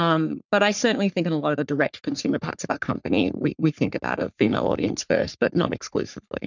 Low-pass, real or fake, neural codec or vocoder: 7.2 kHz; fake; codec, 44.1 kHz, 3.4 kbps, Pupu-Codec